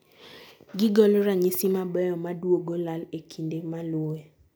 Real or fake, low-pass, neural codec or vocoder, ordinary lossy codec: real; none; none; none